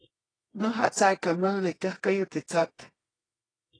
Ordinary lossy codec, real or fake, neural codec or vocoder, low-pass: AAC, 32 kbps; fake; codec, 24 kHz, 0.9 kbps, WavTokenizer, medium music audio release; 9.9 kHz